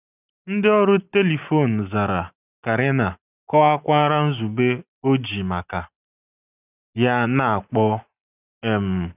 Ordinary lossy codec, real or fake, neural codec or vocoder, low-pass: none; real; none; 3.6 kHz